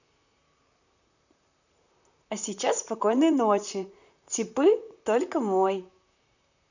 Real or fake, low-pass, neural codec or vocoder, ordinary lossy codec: fake; 7.2 kHz; vocoder, 44.1 kHz, 128 mel bands, Pupu-Vocoder; none